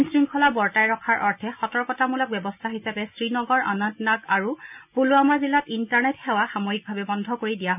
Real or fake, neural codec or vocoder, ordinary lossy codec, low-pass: real; none; none; 3.6 kHz